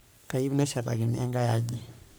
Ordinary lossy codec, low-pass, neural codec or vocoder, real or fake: none; none; codec, 44.1 kHz, 3.4 kbps, Pupu-Codec; fake